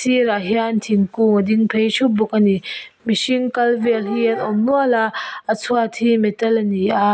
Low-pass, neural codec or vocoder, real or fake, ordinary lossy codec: none; none; real; none